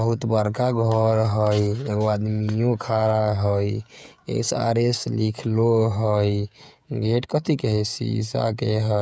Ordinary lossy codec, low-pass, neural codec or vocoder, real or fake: none; none; codec, 16 kHz, 16 kbps, FreqCodec, smaller model; fake